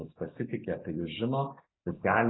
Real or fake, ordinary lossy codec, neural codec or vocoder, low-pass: real; AAC, 16 kbps; none; 7.2 kHz